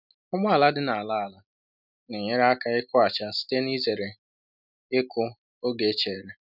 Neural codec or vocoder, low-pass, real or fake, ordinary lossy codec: none; 5.4 kHz; real; none